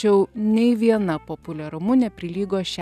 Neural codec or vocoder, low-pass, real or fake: none; 14.4 kHz; real